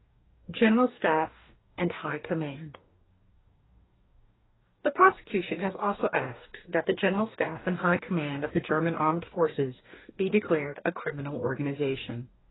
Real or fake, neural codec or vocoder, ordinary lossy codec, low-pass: fake; codec, 44.1 kHz, 2.6 kbps, DAC; AAC, 16 kbps; 7.2 kHz